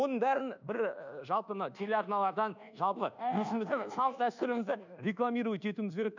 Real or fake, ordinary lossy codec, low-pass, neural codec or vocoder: fake; none; 7.2 kHz; codec, 24 kHz, 1.2 kbps, DualCodec